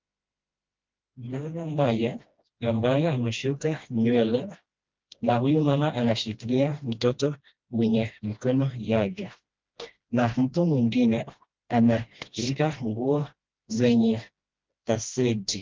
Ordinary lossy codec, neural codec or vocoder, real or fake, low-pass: Opus, 24 kbps; codec, 16 kHz, 1 kbps, FreqCodec, smaller model; fake; 7.2 kHz